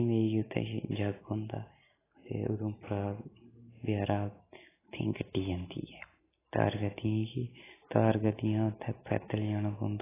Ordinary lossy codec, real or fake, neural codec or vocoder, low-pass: AAC, 16 kbps; real; none; 3.6 kHz